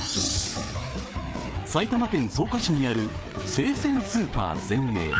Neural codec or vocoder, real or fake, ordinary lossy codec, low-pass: codec, 16 kHz, 4 kbps, FreqCodec, larger model; fake; none; none